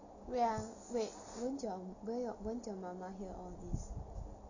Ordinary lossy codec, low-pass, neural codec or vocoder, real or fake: none; 7.2 kHz; none; real